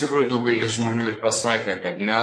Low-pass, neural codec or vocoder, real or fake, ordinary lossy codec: 9.9 kHz; codec, 24 kHz, 1 kbps, SNAC; fake; MP3, 64 kbps